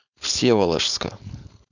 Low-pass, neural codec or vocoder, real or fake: 7.2 kHz; codec, 16 kHz, 4.8 kbps, FACodec; fake